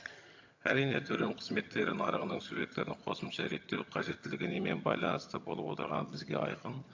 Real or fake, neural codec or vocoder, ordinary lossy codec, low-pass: fake; vocoder, 22.05 kHz, 80 mel bands, HiFi-GAN; AAC, 48 kbps; 7.2 kHz